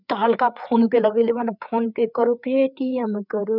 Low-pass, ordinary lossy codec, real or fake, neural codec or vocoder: 5.4 kHz; none; fake; codec, 16 kHz, 4 kbps, FreqCodec, larger model